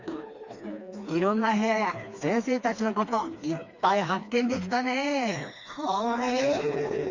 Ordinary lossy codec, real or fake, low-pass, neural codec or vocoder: Opus, 64 kbps; fake; 7.2 kHz; codec, 16 kHz, 2 kbps, FreqCodec, smaller model